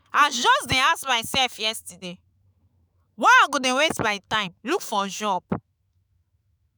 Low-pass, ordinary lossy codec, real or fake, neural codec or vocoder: none; none; fake; autoencoder, 48 kHz, 128 numbers a frame, DAC-VAE, trained on Japanese speech